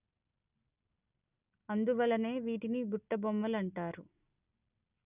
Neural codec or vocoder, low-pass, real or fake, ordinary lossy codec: none; 3.6 kHz; real; none